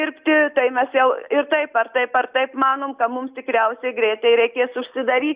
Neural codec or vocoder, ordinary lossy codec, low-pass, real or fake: none; Opus, 64 kbps; 3.6 kHz; real